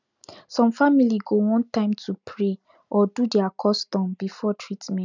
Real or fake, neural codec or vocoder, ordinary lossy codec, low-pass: real; none; none; 7.2 kHz